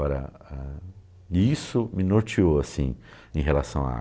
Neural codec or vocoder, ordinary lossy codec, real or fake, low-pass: none; none; real; none